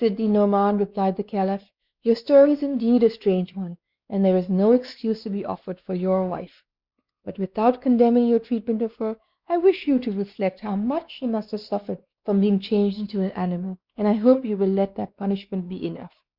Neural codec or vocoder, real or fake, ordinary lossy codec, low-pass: codec, 16 kHz, 2 kbps, X-Codec, WavLM features, trained on Multilingual LibriSpeech; fake; Opus, 64 kbps; 5.4 kHz